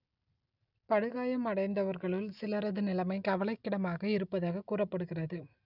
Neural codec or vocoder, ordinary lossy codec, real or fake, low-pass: none; none; real; 5.4 kHz